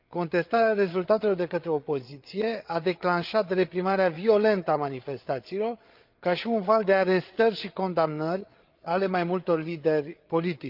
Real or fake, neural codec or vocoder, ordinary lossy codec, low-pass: fake; codec, 16 kHz, 8 kbps, FreqCodec, larger model; Opus, 24 kbps; 5.4 kHz